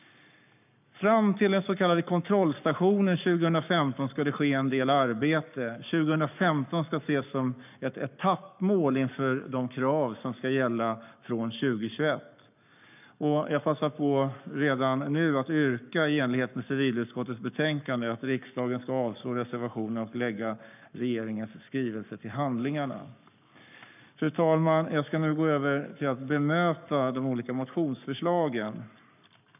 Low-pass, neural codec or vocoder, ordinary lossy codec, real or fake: 3.6 kHz; codec, 44.1 kHz, 7.8 kbps, Pupu-Codec; none; fake